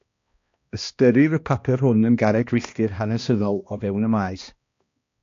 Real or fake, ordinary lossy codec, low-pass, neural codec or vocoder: fake; AAC, 48 kbps; 7.2 kHz; codec, 16 kHz, 1 kbps, X-Codec, HuBERT features, trained on balanced general audio